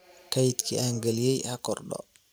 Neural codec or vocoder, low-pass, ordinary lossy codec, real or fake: vocoder, 44.1 kHz, 128 mel bands every 512 samples, BigVGAN v2; none; none; fake